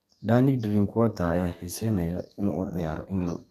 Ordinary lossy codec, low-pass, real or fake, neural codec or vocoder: none; 14.4 kHz; fake; codec, 32 kHz, 1.9 kbps, SNAC